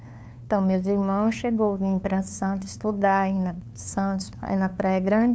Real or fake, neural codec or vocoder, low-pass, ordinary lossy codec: fake; codec, 16 kHz, 2 kbps, FunCodec, trained on LibriTTS, 25 frames a second; none; none